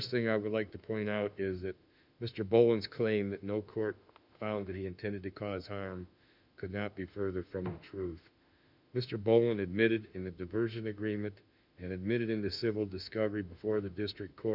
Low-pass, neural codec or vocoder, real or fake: 5.4 kHz; autoencoder, 48 kHz, 32 numbers a frame, DAC-VAE, trained on Japanese speech; fake